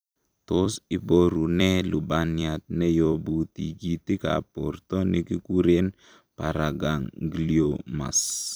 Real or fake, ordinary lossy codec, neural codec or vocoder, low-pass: fake; none; vocoder, 44.1 kHz, 128 mel bands every 256 samples, BigVGAN v2; none